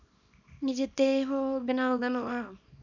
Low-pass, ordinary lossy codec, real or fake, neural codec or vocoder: 7.2 kHz; none; fake; codec, 24 kHz, 0.9 kbps, WavTokenizer, small release